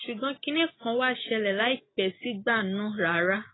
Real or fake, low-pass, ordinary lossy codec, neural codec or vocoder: real; 7.2 kHz; AAC, 16 kbps; none